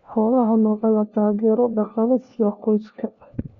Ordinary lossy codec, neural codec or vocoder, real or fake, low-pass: none; codec, 16 kHz, 1 kbps, FunCodec, trained on LibriTTS, 50 frames a second; fake; 7.2 kHz